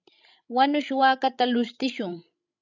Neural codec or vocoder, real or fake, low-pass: codec, 16 kHz, 16 kbps, FreqCodec, larger model; fake; 7.2 kHz